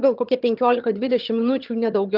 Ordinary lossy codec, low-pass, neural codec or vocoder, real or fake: Opus, 32 kbps; 5.4 kHz; vocoder, 22.05 kHz, 80 mel bands, HiFi-GAN; fake